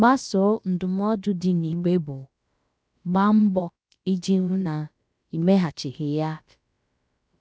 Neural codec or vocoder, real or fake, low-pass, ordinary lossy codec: codec, 16 kHz, about 1 kbps, DyCAST, with the encoder's durations; fake; none; none